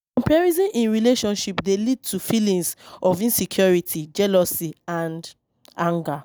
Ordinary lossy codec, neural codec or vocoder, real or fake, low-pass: none; none; real; none